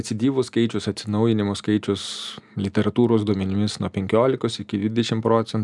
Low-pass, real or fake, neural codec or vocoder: 10.8 kHz; real; none